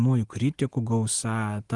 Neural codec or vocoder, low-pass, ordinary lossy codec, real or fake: none; 10.8 kHz; Opus, 24 kbps; real